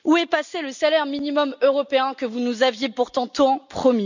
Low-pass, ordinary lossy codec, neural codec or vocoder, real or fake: 7.2 kHz; none; none; real